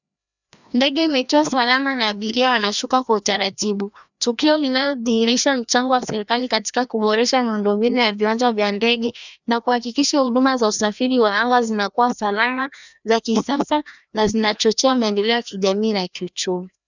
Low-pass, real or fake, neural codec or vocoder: 7.2 kHz; fake; codec, 16 kHz, 1 kbps, FreqCodec, larger model